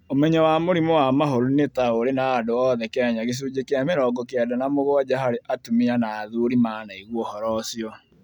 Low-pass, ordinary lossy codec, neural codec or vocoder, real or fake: 19.8 kHz; none; none; real